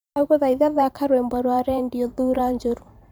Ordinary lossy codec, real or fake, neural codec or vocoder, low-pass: none; fake; vocoder, 44.1 kHz, 128 mel bands every 512 samples, BigVGAN v2; none